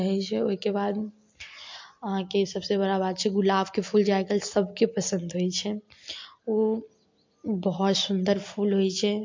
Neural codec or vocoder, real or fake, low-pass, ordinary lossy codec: none; real; 7.2 kHz; MP3, 48 kbps